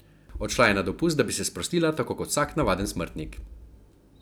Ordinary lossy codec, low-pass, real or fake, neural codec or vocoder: none; none; real; none